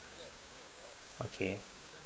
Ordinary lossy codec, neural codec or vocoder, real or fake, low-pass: none; codec, 16 kHz, 6 kbps, DAC; fake; none